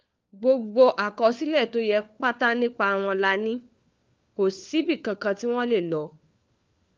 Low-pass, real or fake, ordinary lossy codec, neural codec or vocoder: 7.2 kHz; fake; Opus, 32 kbps; codec, 16 kHz, 4 kbps, FunCodec, trained on LibriTTS, 50 frames a second